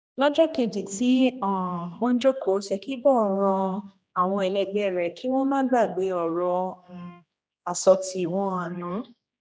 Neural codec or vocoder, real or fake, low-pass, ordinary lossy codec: codec, 16 kHz, 1 kbps, X-Codec, HuBERT features, trained on general audio; fake; none; none